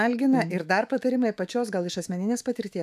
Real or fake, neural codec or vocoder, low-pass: fake; autoencoder, 48 kHz, 128 numbers a frame, DAC-VAE, trained on Japanese speech; 14.4 kHz